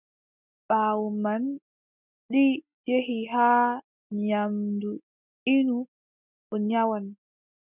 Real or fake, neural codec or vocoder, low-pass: real; none; 3.6 kHz